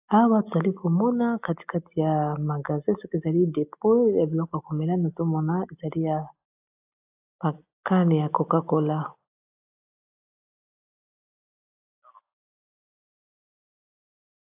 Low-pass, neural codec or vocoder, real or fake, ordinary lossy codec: 3.6 kHz; none; real; AAC, 32 kbps